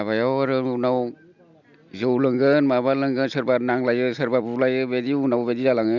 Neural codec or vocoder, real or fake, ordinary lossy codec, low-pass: none; real; Opus, 64 kbps; 7.2 kHz